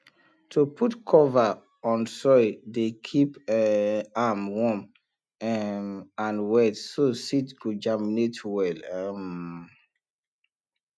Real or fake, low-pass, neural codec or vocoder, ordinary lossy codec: real; none; none; none